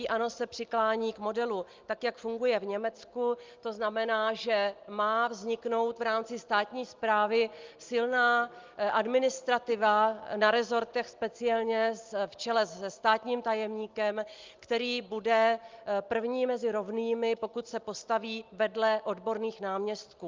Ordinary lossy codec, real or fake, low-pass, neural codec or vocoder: Opus, 24 kbps; real; 7.2 kHz; none